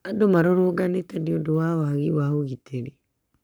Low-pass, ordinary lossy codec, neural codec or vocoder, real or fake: none; none; codec, 44.1 kHz, 7.8 kbps, Pupu-Codec; fake